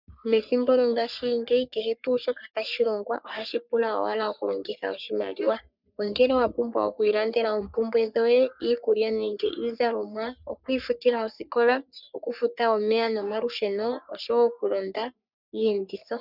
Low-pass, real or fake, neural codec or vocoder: 5.4 kHz; fake; codec, 44.1 kHz, 3.4 kbps, Pupu-Codec